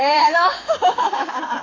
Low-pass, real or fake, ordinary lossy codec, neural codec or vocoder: 7.2 kHz; fake; AAC, 32 kbps; autoencoder, 48 kHz, 32 numbers a frame, DAC-VAE, trained on Japanese speech